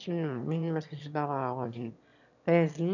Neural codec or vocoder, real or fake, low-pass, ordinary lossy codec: autoencoder, 22.05 kHz, a latent of 192 numbers a frame, VITS, trained on one speaker; fake; 7.2 kHz; none